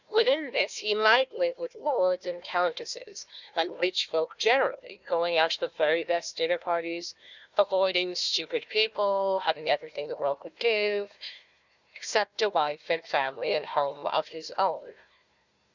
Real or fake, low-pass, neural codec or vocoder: fake; 7.2 kHz; codec, 16 kHz, 1 kbps, FunCodec, trained on Chinese and English, 50 frames a second